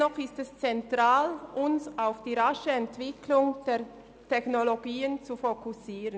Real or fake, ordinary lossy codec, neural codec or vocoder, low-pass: real; none; none; none